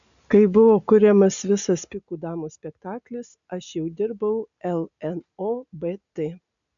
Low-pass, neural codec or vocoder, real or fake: 7.2 kHz; none; real